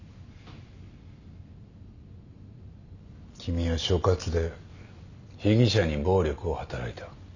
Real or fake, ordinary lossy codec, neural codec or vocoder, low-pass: real; none; none; 7.2 kHz